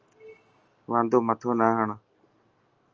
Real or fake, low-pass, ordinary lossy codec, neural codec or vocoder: real; 7.2 kHz; Opus, 24 kbps; none